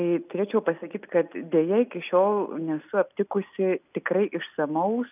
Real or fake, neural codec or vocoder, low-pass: real; none; 3.6 kHz